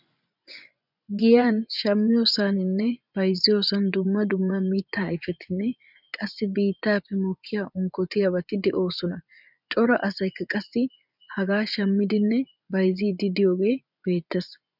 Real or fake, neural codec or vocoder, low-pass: fake; vocoder, 24 kHz, 100 mel bands, Vocos; 5.4 kHz